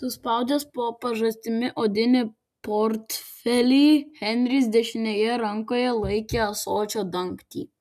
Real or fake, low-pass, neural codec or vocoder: real; 14.4 kHz; none